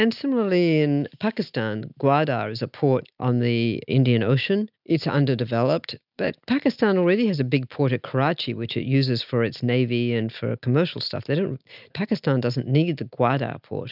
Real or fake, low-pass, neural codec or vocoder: real; 5.4 kHz; none